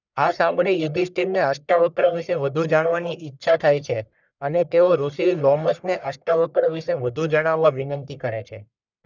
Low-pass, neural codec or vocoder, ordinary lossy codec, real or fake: 7.2 kHz; codec, 44.1 kHz, 1.7 kbps, Pupu-Codec; none; fake